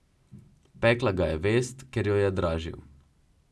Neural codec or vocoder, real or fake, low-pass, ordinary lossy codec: none; real; none; none